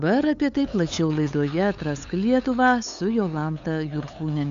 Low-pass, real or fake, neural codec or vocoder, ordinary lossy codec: 7.2 kHz; fake; codec, 16 kHz, 8 kbps, FunCodec, trained on LibriTTS, 25 frames a second; AAC, 64 kbps